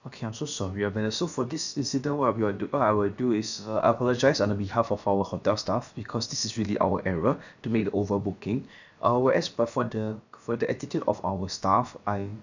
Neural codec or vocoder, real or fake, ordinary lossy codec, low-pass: codec, 16 kHz, about 1 kbps, DyCAST, with the encoder's durations; fake; none; 7.2 kHz